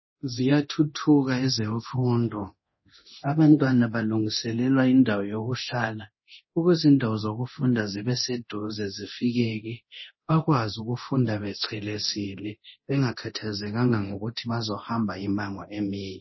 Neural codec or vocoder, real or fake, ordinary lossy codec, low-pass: codec, 24 kHz, 0.9 kbps, DualCodec; fake; MP3, 24 kbps; 7.2 kHz